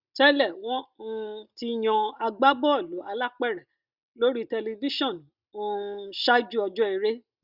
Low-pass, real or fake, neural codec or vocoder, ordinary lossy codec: 5.4 kHz; real; none; Opus, 64 kbps